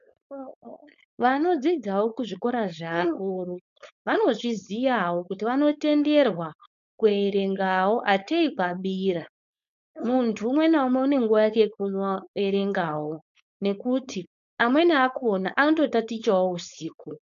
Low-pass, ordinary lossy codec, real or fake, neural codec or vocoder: 7.2 kHz; MP3, 96 kbps; fake; codec, 16 kHz, 4.8 kbps, FACodec